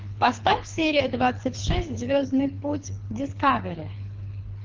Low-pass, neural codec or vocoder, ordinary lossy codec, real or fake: 7.2 kHz; codec, 24 kHz, 3 kbps, HILCodec; Opus, 24 kbps; fake